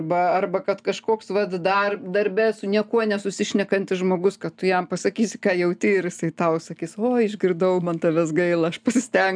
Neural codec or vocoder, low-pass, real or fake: none; 9.9 kHz; real